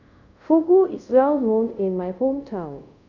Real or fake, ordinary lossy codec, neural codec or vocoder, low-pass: fake; none; codec, 24 kHz, 0.5 kbps, DualCodec; 7.2 kHz